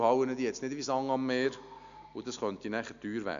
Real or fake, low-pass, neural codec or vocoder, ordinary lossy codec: real; 7.2 kHz; none; none